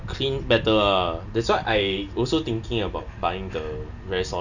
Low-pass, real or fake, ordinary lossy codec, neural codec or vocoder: 7.2 kHz; real; none; none